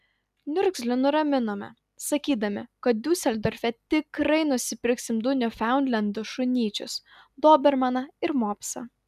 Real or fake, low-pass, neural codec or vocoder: real; 14.4 kHz; none